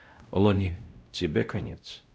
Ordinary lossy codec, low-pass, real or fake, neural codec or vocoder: none; none; fake; codec, 16 kHz, 0.5 kbps, X-Codec, WavLM features, trained on Multilingual LibriSpeech